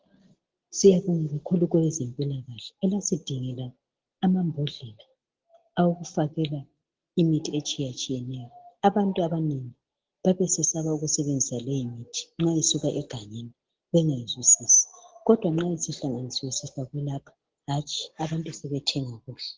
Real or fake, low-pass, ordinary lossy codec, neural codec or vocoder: real; 7.2 kHz; Opus, 16 kbps; none